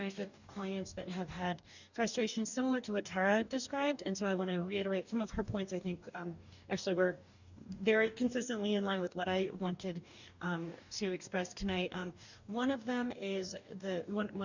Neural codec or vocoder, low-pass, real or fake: codec, 44.1 kHz, 2.6 kbps, DAC; 7.2 kHz; fake